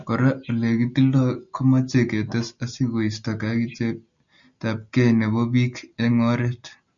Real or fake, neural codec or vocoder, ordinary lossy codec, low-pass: real; none; MP3, 96 kbps; 7.2 kHz